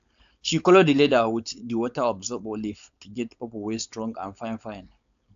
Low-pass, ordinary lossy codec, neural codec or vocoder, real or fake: 7.2 kHz; AAC, 64 kbps; codec, 16 kHz, 4.8 kbps, FACodec; fake